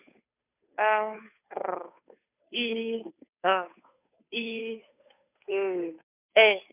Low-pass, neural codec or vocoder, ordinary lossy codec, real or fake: 3.6 kHz; codec, 16 kHz, 2 kbps, FunCodec, trained on Chinese and English, 25 frames a second; none; fake